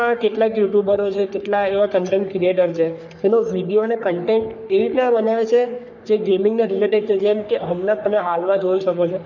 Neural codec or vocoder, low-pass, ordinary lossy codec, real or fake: codec, 44.1 kHz, 3.4 kbps, Pupu-Codec; 7.2 kHz; none; fake